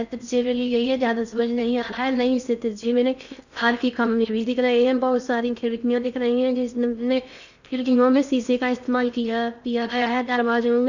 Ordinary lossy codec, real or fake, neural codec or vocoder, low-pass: none; fake; codec, 16 kHz in and 24 kHz out, 0.6 kbps, FocalCodec, streaming, 4096 codes; 7.2 kHz